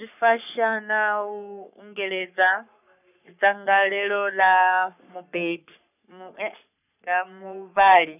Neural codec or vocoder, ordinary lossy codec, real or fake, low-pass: codec, 44.1 kHz, 3.4 kbps, Pupu-Codec; none; fake; 3.6 kHz